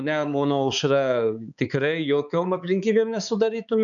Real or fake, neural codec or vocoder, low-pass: fake; codec, 16 kHz, 4 kbps, X-Codec, HuBERT features, trained on balanced general audio; 7.2 kHz